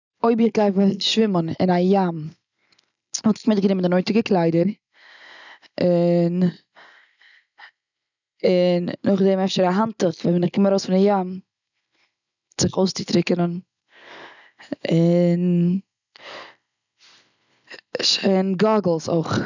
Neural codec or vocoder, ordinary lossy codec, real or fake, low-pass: none; none; real; 7.2 kHz